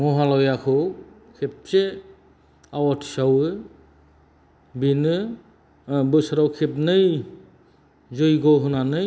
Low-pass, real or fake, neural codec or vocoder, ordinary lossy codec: none; real; none; none